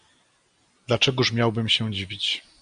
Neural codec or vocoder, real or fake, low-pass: none; real; 9.9 kHz